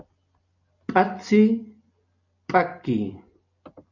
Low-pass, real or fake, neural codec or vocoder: 7.2 kHz; real; none